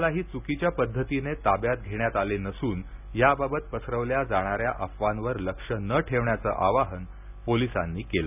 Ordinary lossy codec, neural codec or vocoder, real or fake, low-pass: none; none; real; 3.6 kHz